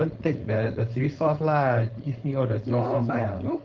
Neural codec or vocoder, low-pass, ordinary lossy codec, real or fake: codec, 16 kHz, 4.8 kbps, FACodec; 7.2 kHz; Opus, 32 kbps; fake